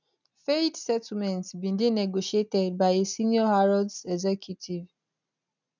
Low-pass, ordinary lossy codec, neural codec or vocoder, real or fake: 7.2 kHz; none; none; real